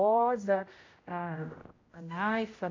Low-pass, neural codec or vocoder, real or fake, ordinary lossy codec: 7.2 kHz; codec, 16 kHz, 0.5 kbps, X-Codec, HuBERT features, trained on general audio; fake; AAC, 32 kbps